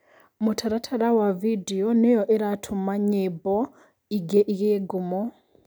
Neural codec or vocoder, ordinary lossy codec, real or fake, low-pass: none; none; real; none